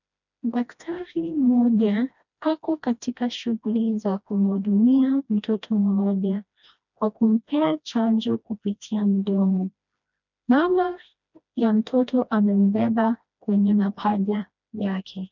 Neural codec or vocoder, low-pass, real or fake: codec, 16 kHz, 1 kbps, FreqCodec, smaller model; 7.2 kHz; fake